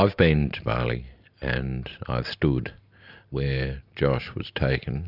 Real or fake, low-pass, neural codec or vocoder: real; 5.4 kHz; none